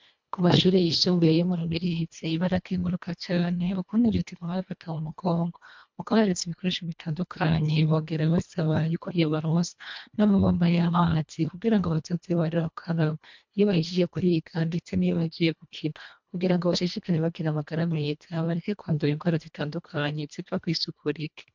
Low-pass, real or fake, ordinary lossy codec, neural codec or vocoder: 7.2 kHz; fake; MP3, 64 kbps; codec, 24 kHz, 1.5 kbps, HILCodec